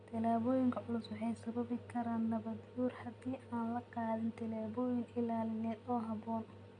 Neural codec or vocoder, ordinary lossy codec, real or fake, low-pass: none; none; real; 9.9 kHz